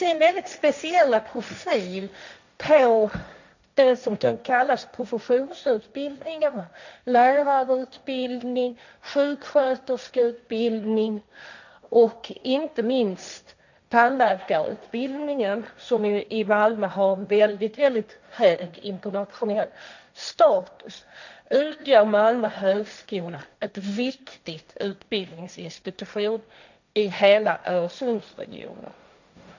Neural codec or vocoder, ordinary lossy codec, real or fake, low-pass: codec, 16 kHz, 1.1 kbps, Voila-Tokenizer; none; fake; 7.2 kHz